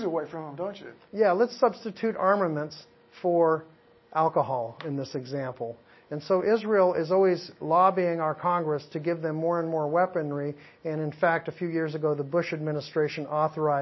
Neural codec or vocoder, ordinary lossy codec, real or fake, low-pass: none; MP3, 24 kbps; real; 7.2 kHz